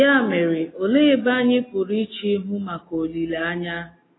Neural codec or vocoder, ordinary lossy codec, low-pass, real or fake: none; AAC, 16 kbps; 7.2 kHz; real